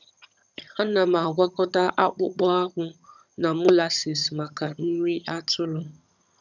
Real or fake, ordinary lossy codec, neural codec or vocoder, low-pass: fake; none; vocoder, 22.05 kHz, 80 mel bands, HiFi-GAN; 7.2 kHz